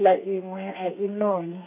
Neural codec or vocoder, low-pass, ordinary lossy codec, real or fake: codec, 32 kHz, 1.9 kbps, SNAC; 3.6 kHz; none; fake